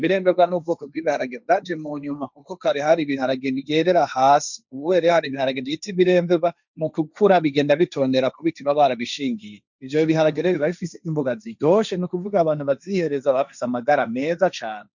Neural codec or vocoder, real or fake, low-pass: codec, 16 kHz, 1.1 kbps, Voila-Tokenizer; fake; 7.2 kHz